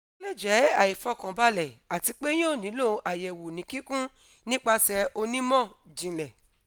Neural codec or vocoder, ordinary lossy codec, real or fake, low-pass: none; none; real; none